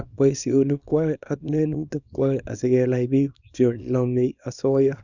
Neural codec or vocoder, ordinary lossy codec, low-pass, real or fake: codec, 24 kHz, 0.9 kbps, WavTokenizer, small release; none; 7.2 kHz; fake